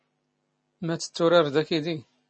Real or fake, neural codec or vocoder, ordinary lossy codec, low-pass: real; none; MP3, 32 kbps; 9.9 kHz